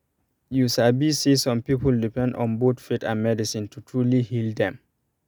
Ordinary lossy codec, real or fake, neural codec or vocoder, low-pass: none; real; none; none